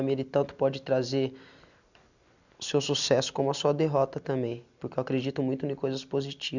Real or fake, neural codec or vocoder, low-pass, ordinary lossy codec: real; none; 7.2 kHz; none